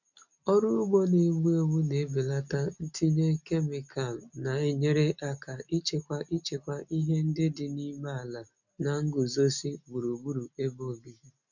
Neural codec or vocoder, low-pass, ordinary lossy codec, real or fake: none; 7.2 kHz; none; real